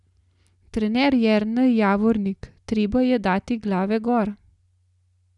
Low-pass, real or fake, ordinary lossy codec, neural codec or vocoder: 10.8 kHz; real; none; none